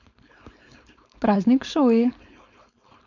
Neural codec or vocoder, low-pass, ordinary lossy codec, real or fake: codec, 16 kHz, 4.8 kbps, FACodec; 7.2 kHz; none; fake